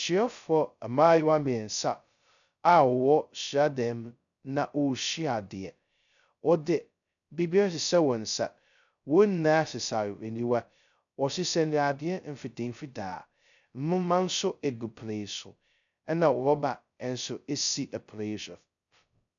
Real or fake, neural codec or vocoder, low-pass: fake; codec, 16 kHz, 0.2 kbps, FocalCodec; 7.2 kHz